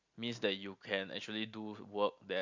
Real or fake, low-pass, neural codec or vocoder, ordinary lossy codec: real; 7.2 kHz; none; Opus, 64 kbps